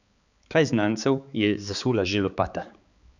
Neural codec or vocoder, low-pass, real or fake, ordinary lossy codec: codec, 16 kHz, 4 kbps, X-Codec, HuBERT features, trained on balanced general audio; 7.2 kHz; fake; none